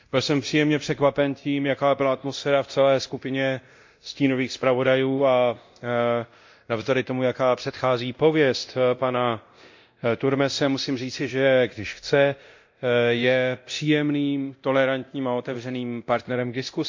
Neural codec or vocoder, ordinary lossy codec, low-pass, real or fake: codec, 24 kHz, 0.9 kbps, DualCodec; MP3, 48 kbps; 7.2 kHz; fake